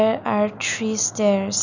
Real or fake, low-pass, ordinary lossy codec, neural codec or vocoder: real; 7.2 kHz; none; none